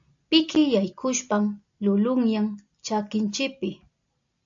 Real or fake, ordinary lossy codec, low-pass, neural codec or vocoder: real; AAC, 64 kbps; 7.2 kHz; none